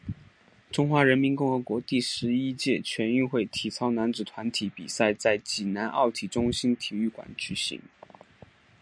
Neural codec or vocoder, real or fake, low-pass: none; real; 9.9 kHz